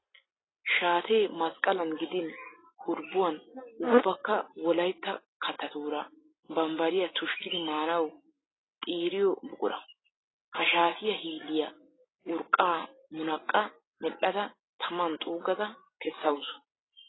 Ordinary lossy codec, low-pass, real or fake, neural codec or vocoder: AAC, 16 kbps; 7.2 kHz; real; none